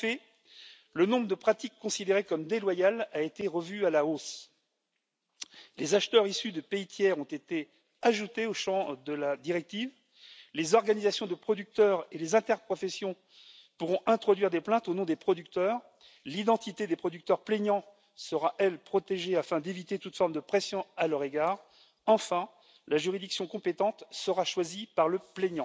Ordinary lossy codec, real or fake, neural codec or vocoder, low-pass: none; real; none; none